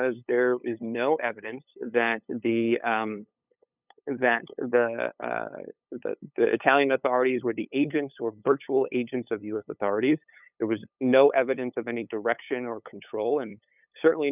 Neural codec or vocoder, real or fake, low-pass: codec, 16 kHz, 8 kbps, FunCodec, trained on LibriTTS, 25 frames a second; fake; 3.6 kHz